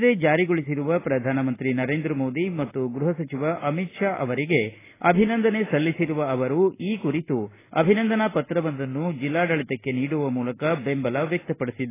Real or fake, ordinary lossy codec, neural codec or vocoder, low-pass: real; AAC, 16 kbps; none; 3.6 kHz